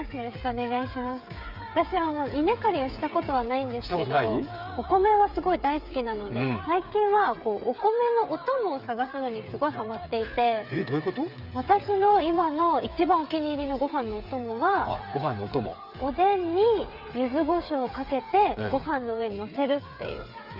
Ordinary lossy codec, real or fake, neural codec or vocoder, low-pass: Opus, 64 kbps; fake; codec, 16 kHz, 8 kbps, FreqCodec, smaller model; 5.4 kHz